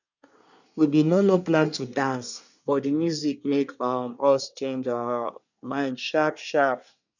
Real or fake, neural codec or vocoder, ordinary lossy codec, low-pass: fake; codec, 24 kHz, 1 kbps, SNAC; none; 7.2 kHz